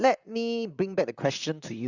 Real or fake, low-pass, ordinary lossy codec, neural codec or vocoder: fake; 7.2 kHz; Opus, 64 kbps; vocoder, 44.1 kHz, 128 mel bands, Pupu-Vocoder